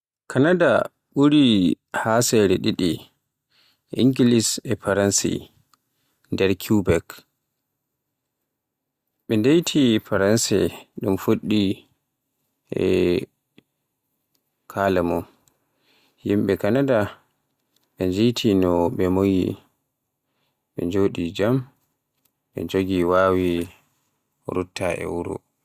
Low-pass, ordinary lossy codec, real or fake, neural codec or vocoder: 14.4 kHz; Opus, 64 kbps; real; none